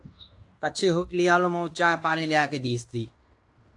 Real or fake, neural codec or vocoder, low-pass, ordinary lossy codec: fake; codec, 16 kHz in and 24 kHz out, 0.9 kbps, LongCat-Audio-Codec, fine tuned four codebook decoder; 10.8 kHz; AAC, 64 kbps